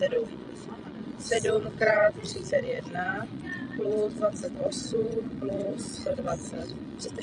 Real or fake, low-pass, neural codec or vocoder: fake; 9.9 kHz; vocoder, 22.05 kHz, 80 mel bands, Vocos